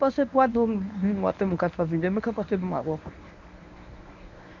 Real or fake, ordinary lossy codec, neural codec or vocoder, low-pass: fake; none; codec, 24 kHz, 0.9 kbps, WavTokenizer, medium speech release version 1; 7.2 kHz